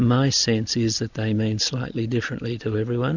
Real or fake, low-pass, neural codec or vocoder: real; 7.2 kHz; none